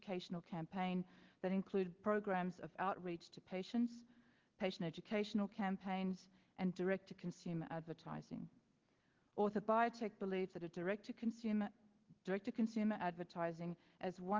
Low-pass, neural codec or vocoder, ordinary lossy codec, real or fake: 7.2 kHz; none; Opus, 16 kbps; real